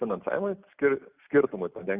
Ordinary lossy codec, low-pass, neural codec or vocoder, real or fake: Opus, 64 kbps; 3.6 kHz; none; real